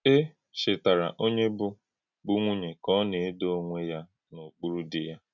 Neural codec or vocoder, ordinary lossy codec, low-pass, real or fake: none; none; 7.2 kHz; real